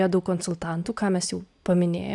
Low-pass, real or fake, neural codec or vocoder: 10.8 kHz; real; none